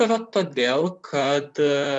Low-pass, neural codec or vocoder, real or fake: 10.8 kHz; vocoder, 44.1 kHz, 128 mel bands, Pupu-Vocoder; fake